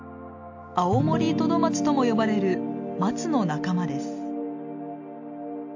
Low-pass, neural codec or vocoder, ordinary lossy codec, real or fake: 7.2 kHz; none; AAC, 48 kbps; real